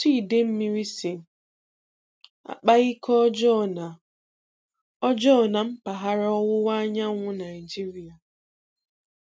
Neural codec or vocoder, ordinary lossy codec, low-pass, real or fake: none; none; none; real